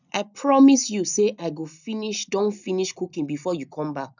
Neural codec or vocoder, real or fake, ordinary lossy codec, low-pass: none; real; none; 7.2 kHz